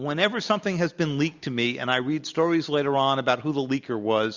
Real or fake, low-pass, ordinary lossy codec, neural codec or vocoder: real; 7.2 kHz; Opus, 64 kbps; none